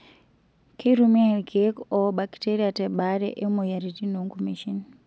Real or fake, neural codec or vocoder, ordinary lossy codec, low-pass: real; none; none; none